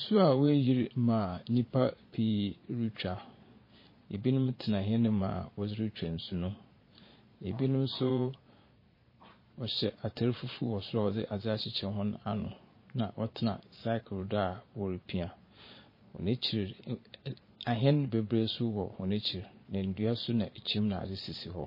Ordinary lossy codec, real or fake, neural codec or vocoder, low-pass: MP3, 24 kbps; fake; vocoder, 22.05 kHz, 80 mel bands, Vocos; 5.4 kHz